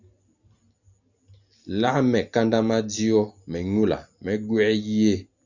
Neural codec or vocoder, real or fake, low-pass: none; real; 7.2 kHz